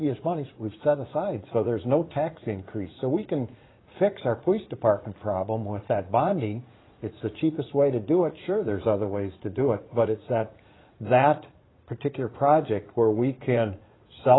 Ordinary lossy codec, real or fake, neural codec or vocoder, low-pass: AAC, 16 kbps; fake; vocoder, 44.1 kHz, 80 mel bands, Vocos; 7.2 kHz